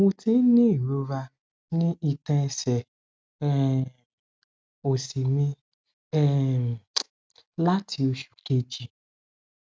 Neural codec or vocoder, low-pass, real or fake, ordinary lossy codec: none; none; real; none